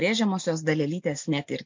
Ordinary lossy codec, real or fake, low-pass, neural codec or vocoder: MP3, 48 kbps; real; 7.2 kHz; none